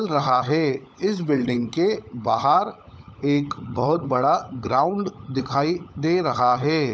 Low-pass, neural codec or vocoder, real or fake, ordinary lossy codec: none; codec, 16 kHz, 16 kbps, FunCodec, trained on LibriTTS, 50 frames a second; fake; none